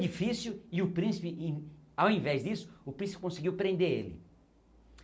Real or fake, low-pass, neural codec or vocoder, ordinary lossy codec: real; none; none; none